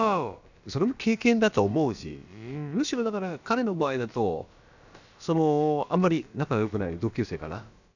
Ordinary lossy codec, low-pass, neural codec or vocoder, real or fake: none; 7.2 kHz; codec, 16 kHz, about 1 kbps, DyCAST, with the encoder's durations; fake